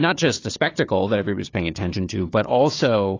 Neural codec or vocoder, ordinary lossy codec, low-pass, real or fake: codec, 16 kHz, 4 kbps, FunCodec, trained on Chinese and English, 50 frames a second; AAC, 32 kbps; 7.2 kHz; fake